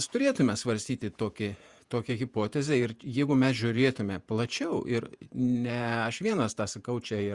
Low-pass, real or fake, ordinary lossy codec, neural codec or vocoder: 10.8 kHz; fake; Opus, 64 kbps; vocoder, 48 kHz, 128 mel bands, Vocos